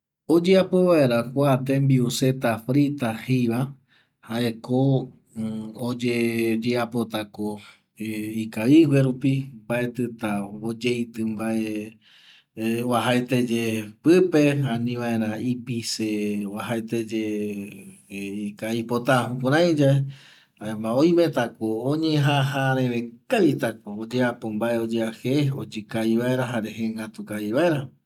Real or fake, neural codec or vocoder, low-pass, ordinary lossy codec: real; none; 19.8 kHz; none